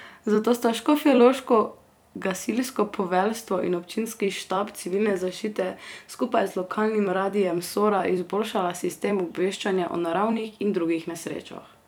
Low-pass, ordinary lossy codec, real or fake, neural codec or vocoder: none; none; fake; vocoder, 44.1 kHz, 128 mel bands every 256 samples, BigVGAN v2